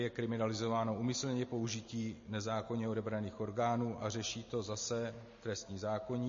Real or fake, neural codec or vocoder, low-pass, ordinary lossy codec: real; none; 7.2 kHz; MP3, 32 kbps